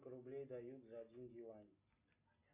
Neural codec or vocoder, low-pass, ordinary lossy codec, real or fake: none; 3.6 kHz; MP3, 32 kbps; real